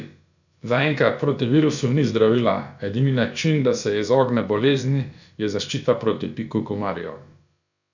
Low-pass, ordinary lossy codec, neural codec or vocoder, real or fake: 7.2 kHz; none; codec, 16 kHz, about 1 kbps, DyCAST, with the encoder's durations; fake